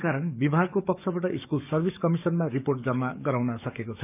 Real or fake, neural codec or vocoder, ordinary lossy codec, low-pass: fake; codec, 44.1 kHz, 7.8 kbps, DAC; none; 3.6 kHz